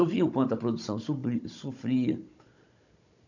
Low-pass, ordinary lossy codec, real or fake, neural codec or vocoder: 7.2 kHz; none; fake; codec, 16 kHz, 16 kbps, FunCodec, trained on Chinese and English, 50 frames a second